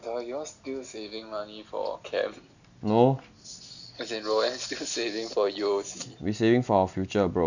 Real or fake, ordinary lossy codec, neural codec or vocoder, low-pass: real; none; none; 7.2 kHz